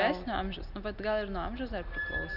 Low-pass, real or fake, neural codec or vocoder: 5.4 kHz; real; none